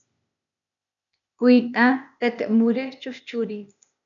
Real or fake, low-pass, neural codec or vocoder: fake; 7.2 kHz; codec, 16 kHz, 0.8 kbps, ZipCodec